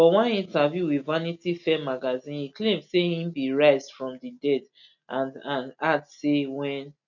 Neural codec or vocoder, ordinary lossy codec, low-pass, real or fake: none; none; 7.2 kHz; real